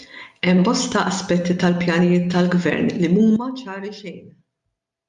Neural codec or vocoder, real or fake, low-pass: none; real; 10.8 kHz